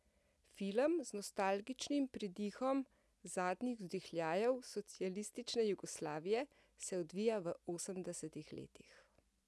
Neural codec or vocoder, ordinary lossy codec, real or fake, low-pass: none; none; real; none